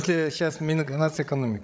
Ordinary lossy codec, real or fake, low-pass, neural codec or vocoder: none; fake; none; codec, 16 kHz, 16 kbps, FreqCodec, larger model